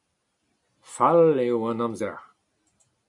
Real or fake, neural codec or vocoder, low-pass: real; none; 10.8 kHz